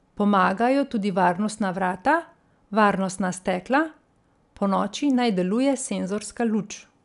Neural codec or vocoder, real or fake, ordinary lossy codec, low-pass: none; real; none; 10.8 kHz